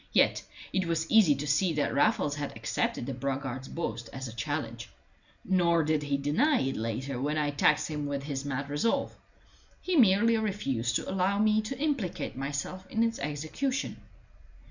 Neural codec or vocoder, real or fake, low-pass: none; real; 7.2 kHz